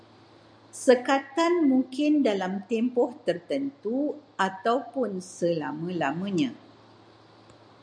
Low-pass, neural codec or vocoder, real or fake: 9.9 kHz; none; real